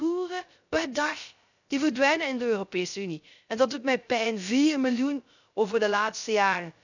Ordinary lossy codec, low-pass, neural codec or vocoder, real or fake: none; 7.2 kHz; codec, 16 kHz, 0.3 kbps, FocalCodec; fake